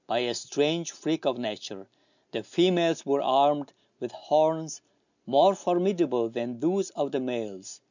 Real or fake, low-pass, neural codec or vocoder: real; 7.2 kHz; none